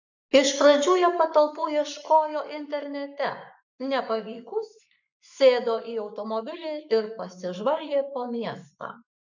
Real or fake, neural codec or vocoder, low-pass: fake; codec, 44.1 kHz, 7.8 kbps, Pupu-Codec; 7.2 kHz